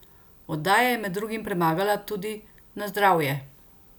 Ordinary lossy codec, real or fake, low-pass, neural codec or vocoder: none; real; none; none